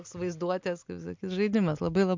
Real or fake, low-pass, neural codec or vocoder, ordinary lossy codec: real; 7.2 kHz; none; MP3, 64 kbps